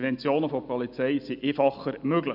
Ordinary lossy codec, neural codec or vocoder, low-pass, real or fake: none; none; 5.4 kHz; real